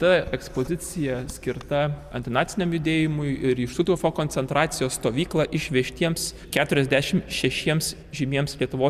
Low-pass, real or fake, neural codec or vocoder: 14.4 kHz; real; none